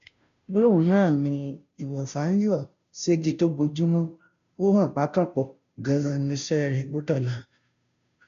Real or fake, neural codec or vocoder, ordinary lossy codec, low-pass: fake; codec, 16 kHz, 0.5 kbps, FunCodec, trained on Chinese and English, 25 frames a second; none; 7.2 kHz